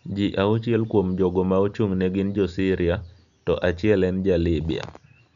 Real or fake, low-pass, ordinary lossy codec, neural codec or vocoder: real; 7.2 kHz; none; none